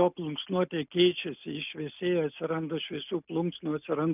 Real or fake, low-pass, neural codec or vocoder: real; 3.6 kHz; none